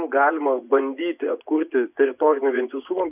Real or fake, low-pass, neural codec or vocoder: fake; 3.6 kHz; vocoder, 24 kHz, 100 mel bands, Vocos